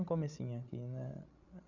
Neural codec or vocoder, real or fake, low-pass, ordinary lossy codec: codec, 16 kHz, 16 kbps, FreqCodec, larger model; fake; 7.2 kHz; none